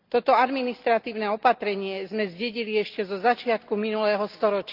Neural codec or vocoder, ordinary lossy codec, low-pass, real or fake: none; Opus, 24 kbps; 5.4 kHz; real